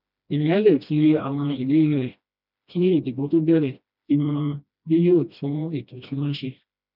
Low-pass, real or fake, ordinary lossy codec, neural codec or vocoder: 5.4 kHz; fake; none; codec, 16 kHz, 1 kbps, FreqCodec, smaller model